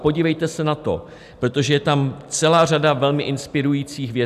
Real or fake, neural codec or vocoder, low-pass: real; none; 14.4 kHz